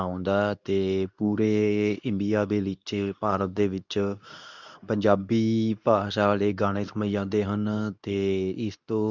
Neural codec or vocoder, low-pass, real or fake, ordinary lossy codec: codec, 24 kHz, 0.9 kbps, WavTokenizer, medium speech release version 2; 7.2 kHz; fake; none